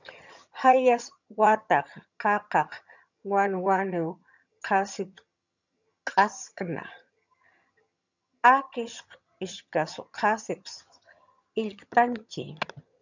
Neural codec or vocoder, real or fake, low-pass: vocoder, 22.05 kHz, 80 mel bands, HiFi-GAN; fake; 7.2 kHz